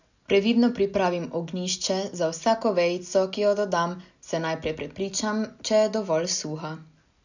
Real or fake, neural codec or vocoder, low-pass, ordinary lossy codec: real; none; 7.2 kHz; none